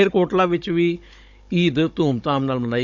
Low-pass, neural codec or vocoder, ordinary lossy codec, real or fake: 7.2 kHz; codec, 16 kHz, 16 kbps, FunCodec, trained on Chinese and English, 50 frames a second; none; fake